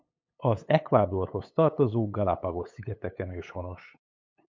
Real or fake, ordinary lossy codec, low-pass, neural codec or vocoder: fake; MP3, 64 kbps; 7.2 kHz; codec, 16 kHz, 8 kbps, FunCodec, trained on LibriTTS, 25 frames a second